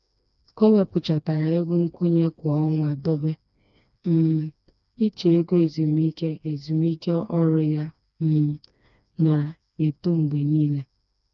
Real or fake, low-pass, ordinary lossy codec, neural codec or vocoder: fake; 7.2 kHz; none; codec, 16 kHz, 2 kbps, FreqCodec, smaller model